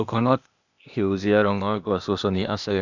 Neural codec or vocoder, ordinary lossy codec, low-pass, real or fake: codec, 16 kHz, 0.8 kbps, ZipCodec; none; 7.2 kHz; fake